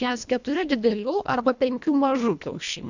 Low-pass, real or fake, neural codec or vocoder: 7.2 kHz; fake; codec, 24 kHz, 1.5 kbps, HILCodec